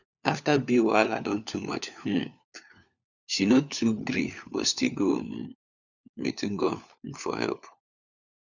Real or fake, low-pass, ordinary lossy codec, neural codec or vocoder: fake; 7.2 kHz; none; codec, 16 kHz, 4 kbps, FunCodec, trained on LibriTTS, 50 frames a second